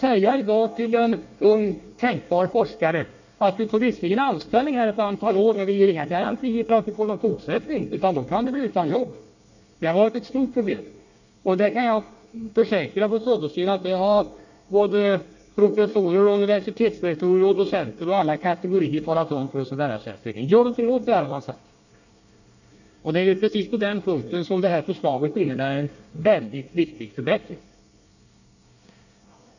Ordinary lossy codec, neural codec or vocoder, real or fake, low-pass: none; codec, 24 kHz, 1 kbps, SNAC; fake; 7.2 kHz